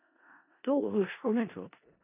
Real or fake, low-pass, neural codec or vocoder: fake; 3.6 kHz; codec, 16 kHz in and 24 kHz out, 0.4 kbps, LongCat-Audio-Codec, four codebook decoder